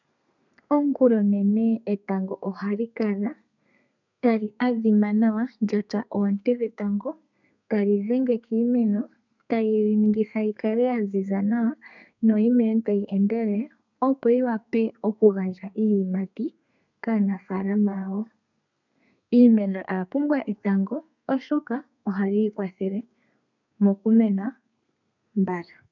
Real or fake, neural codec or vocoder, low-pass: fake; codec, 32 kHz, 1.9 kbps, SNAC; 7.2 kHz